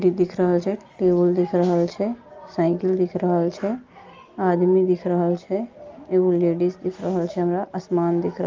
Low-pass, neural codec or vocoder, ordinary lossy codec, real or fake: 7.2 kHz; none; Opus, 32 kbps; real